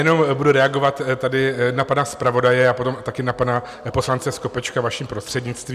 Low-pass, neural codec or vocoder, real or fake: 14.4 kHz; none; real